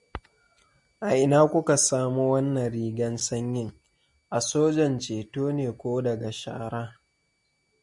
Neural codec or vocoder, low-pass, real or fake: none; 10.8 kHz; real